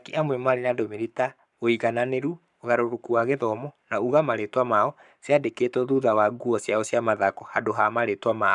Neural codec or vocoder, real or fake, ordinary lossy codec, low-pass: codec, 44.1 kHz, 7.8 kbps, Pupu-Codec; fake; none; 10.8 kHz